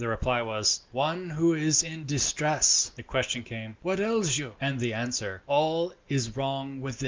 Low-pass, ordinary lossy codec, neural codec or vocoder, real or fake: 7.2 kHz; Opus, 32 kbps; none; real